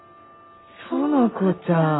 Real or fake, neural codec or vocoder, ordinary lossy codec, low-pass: real; none; AAC, 16 kbps; 7.2 kHz